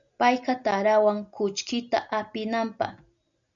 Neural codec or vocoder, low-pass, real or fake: none; 7.2 kHz; real